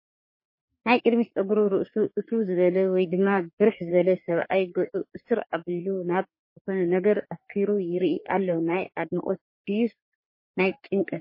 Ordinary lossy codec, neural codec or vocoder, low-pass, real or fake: MP3, 24 kbps; codec, 44.1 kHz, 3.4 kbps, Pupu-Codec; 5.4 kHz; fake